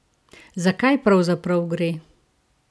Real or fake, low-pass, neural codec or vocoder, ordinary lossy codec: real; none; none; none